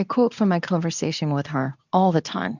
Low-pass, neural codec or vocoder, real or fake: 7.2 kHz; codec, 24 kHz, 0.9 kbps, WavTokenizer, medium speech release version 2; fake